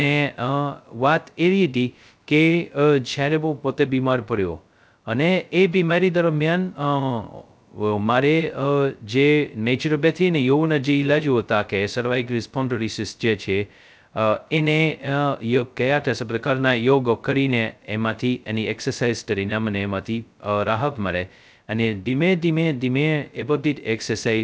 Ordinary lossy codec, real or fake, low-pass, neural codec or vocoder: none; fake; none; codec, 16 kHz, 0.2 kbps, FocalCodec